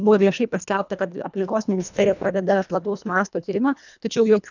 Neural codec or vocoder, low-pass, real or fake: codec, 24 kHz, 1.5 kbps, HILCodec; 7.2 kHz; fake